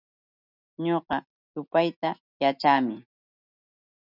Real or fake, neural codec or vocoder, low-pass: real; none; 5.4 kHz